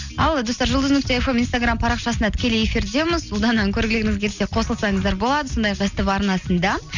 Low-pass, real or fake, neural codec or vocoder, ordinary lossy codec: 7.2 kHz; real; none; none